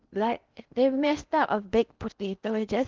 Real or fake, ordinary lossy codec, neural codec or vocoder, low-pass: fake; Opus, 32 kbps; codec, 16 kHz in and 24 kHz out, 0.8 kbps, FocalCodec, streaming, 65536 codes; 7.2 kHz